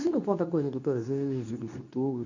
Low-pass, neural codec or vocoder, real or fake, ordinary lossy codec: 7.2 kHz; codec, 24 kHz, 0.9 kbps, WavTokenizer, medium speech release version 2; fake; none